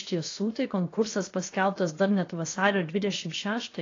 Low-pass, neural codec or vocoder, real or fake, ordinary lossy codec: 7.2 kHz; codec, 16 kHz, 0.7 kbps, FocalCodec; fake; AAC, 32 kbps